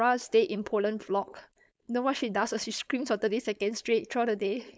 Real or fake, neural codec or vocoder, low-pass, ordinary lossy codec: fake; codec, 16 kHz, 4.8 kbps, FACodec; none; none